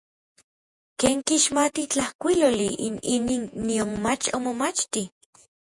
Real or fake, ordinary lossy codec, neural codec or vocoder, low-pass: fake; MP3, 96 kbps; vocoder, 48 kHz, 128 mel bands, Vocos; 10.8 kHz